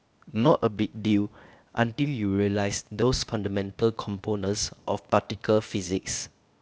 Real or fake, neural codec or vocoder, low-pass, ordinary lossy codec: fake; codec, 16 kHz, 0.8 kbps, ZipCodec; none; none